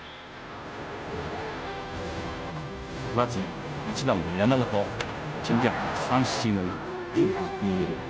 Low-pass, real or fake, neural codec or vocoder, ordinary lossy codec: none; fake; codec, 16 kHz, 0.5 kbps, FunCodec, trained on Chinese and English, 25 frames a second; none